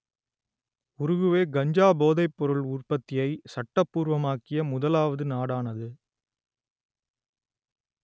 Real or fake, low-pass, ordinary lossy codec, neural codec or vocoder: real; none; none; none